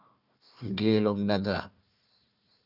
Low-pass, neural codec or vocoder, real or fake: 5.4 kHz; codec, 16 kHz, 1 kbps, FunCodec, trained on Chinese and English, 50 frames a second; fake